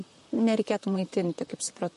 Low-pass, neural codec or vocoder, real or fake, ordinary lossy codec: 14.4 kHz; vocoder, 44.1 kHz, 128 mel bands, Pupu-Vocoder; fake; MP3, 48 kbps